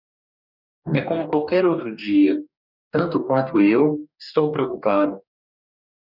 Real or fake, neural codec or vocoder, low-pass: fake; codec, 44.1 kHz, 2.6 kbps, DAC; 5.4 kHz